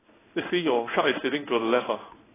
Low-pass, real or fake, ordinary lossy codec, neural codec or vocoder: 3.6 kHz; fake; AAC, 16 kbps; codec, 16 kHz in and 24 kHz out, 1 kbps, XY-Tokenizer